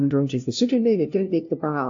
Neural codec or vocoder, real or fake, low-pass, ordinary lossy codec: codec, 16 kHz, 0.5 kbps, FunCodec, trained on LibriTTS, 25 frames a second; fake; 7.2 kHz; AAC, 48 kbps